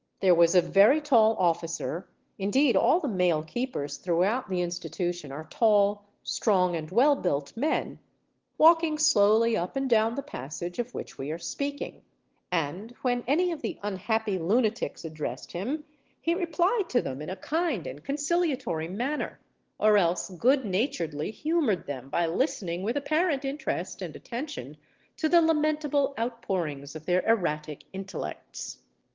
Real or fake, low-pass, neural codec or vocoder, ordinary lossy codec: real; 7.2 kHz; none; Opus, 16 kbps